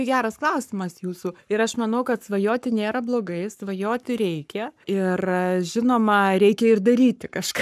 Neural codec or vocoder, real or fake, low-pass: codec, 44.1 kHz, 7.8 kbps, Pupu-Codec; fake; 14.4 kHz